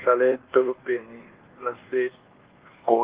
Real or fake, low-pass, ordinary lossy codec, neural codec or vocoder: fake; 3.6 kHz; Opus, 16 kbps; codec, 24 kHz, 1.2 kbps, DualCodec